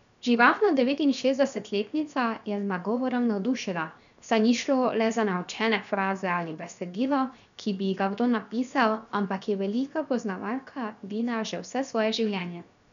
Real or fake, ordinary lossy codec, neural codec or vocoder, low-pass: fake; none; codec, 16 kHz, 0.7 kbps, FocalCodec; 7.2 kHz